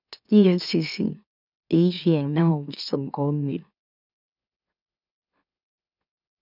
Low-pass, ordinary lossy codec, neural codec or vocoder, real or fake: 5.4 kHz; none; autoencoder, 44.1 kHz, a latent of 192 numbers a frame, MeloTTS; fake